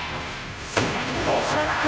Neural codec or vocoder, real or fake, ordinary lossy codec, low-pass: codec, 16 kHz, 0.5 kbps, FunCodec, trained on Chinese and English, 25 frames a second; fake; none; none